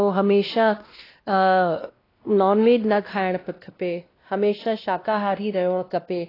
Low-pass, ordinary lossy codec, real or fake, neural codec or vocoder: 5.4 kHz; AAC, 24 kbps; fake; codec, 16 kHz, 1 kbps, X-Codec, WavLM features, trained on Multilingual LibriSpeech